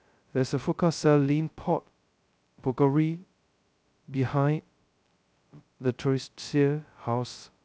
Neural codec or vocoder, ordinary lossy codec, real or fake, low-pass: codec, 16 kHz, 0.2 kbps, FocalCodec; none; fake; none